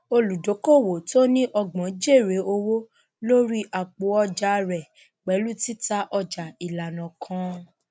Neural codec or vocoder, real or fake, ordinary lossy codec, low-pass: none; real; none; none